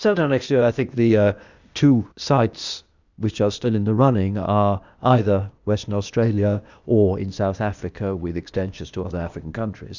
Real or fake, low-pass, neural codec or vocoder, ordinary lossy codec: fake; 7.2 kHz; codec, 16 kHz, 0.8 kbps, ZipCodec; Opus, 64 kbps